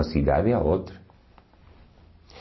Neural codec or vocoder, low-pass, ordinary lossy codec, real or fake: none; 7.2 kHz; MP3, 24 kbps; real